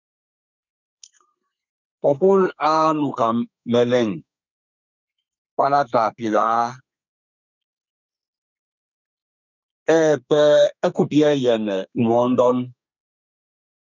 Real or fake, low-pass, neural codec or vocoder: fake; 7.2 kHz; codec, 32 kHz, 1.9 kbps, SNAC